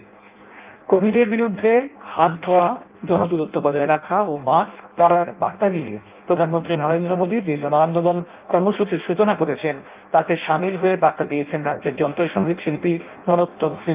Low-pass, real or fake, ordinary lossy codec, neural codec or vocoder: 3.6 kHz; fake; Opus, 24 kbps; codec, 16 kHz in and 24 kHz out, 0.6 kbps, FireRedTTS-2 codec